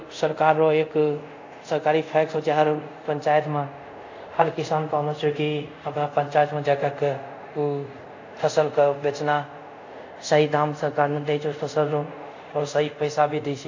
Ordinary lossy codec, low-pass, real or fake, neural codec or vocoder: AAC, 48 kbps; 7.2 kHz; fake; codec, 24 kHz, 0.5 kbps, DualCodec